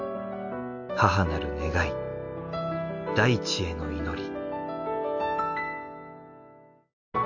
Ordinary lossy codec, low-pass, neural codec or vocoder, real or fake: none; 7.2 kHz; none; real